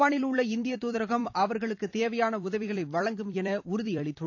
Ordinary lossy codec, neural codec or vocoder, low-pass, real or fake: AAC, 48 kbps; vocoder, 44.1 kHz, 128 mel bands every 256 samples, BigVGAN v2; 7.2 kHz; fake